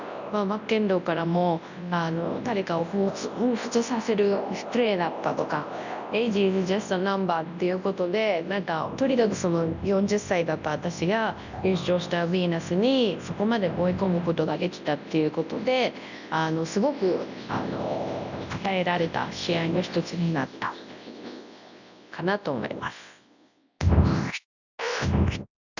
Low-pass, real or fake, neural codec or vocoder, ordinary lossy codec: 7.2 kHz; fake; codec, 24 kHz, 0.9 kbps, WavTokenizer, large speech release; none